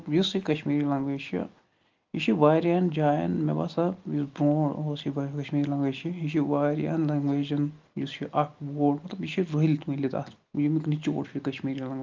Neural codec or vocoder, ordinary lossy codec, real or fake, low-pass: none; Opus, 32 kbps; real; 7.2 kHz